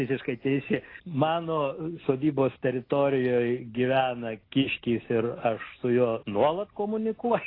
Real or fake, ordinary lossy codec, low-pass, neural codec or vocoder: real; AAC, 24 kbps; 5.4 kHz; none